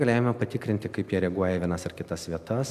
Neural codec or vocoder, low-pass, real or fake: none; 14.4 kHz; real